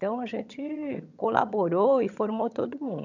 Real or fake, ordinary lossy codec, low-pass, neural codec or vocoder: fake; none; 7.2 kHz; vocoder, 22.05 kHz, 80 mel bands, HiFi-GAN